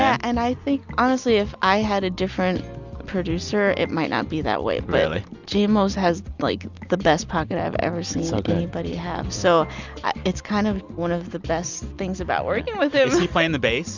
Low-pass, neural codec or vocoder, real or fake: 7.2 kHz; none; real